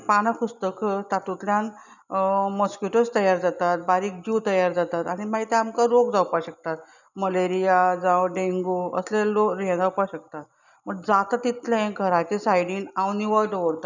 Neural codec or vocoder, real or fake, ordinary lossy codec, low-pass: none; real; none; 7.2 kHz